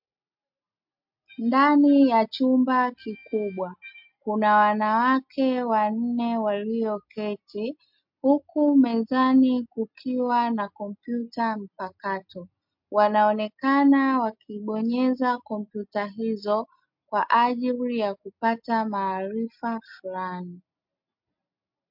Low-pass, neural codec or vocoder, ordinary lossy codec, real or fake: 5.4 kHz; none; MP3, 48 kbps; real